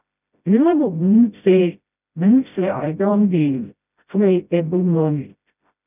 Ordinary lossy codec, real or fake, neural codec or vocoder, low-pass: none; fake; codec, 16 kHz, 0.5 kbps, FreqCodec, smaller model; 3.6 kHz